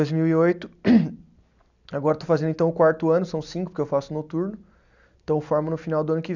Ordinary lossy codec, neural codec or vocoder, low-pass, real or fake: none; none; 7.2 kHz; real